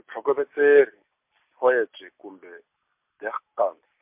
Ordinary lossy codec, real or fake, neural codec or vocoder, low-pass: MP3, 32 kbps; real; none; 3.6 kHz